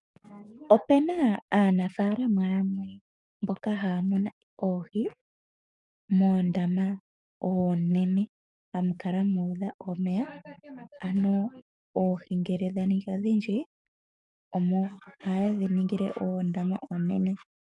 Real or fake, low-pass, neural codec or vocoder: fake; 10.8 kHz; codec, 44.1 kHz, 7.8 kbps, DAC